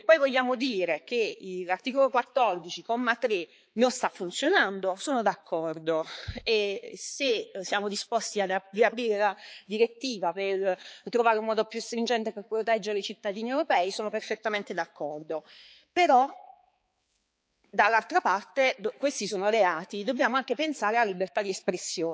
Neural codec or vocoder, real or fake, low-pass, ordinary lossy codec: codec, 16 kHz, 4 kbps, X-Codec, HuBERT features, trained on balanced general audio; fake; none; none